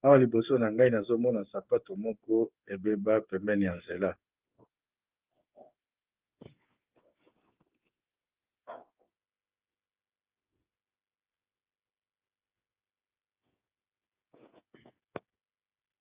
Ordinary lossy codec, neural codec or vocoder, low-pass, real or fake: Opus, 32 kbps; codec, 16 kHz, 8 kbps, FreqCodec, smaller model; 3.6 kHz; fake